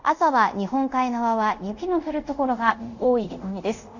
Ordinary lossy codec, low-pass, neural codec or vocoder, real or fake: none; 7.2 kHz; codec, 24 kHz, 0.5 kbps, DualCodec; fake